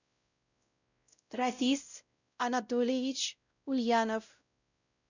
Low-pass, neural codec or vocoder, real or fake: 7.2 kHz; codec, 16 kHz, 0.5 kbps, X-Codec, WavLM features, trained on Multilingual LibriSpeech; fake